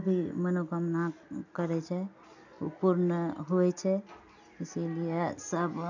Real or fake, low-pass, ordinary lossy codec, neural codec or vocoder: real; 7.2 kHz; none; none